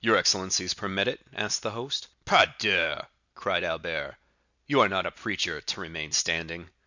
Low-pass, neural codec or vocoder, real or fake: 7.2 kHz; none; real